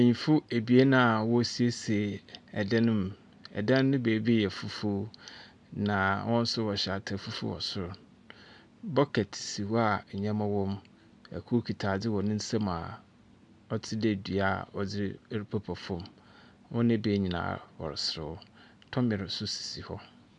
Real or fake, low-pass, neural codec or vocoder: real; 10.8 kHz; none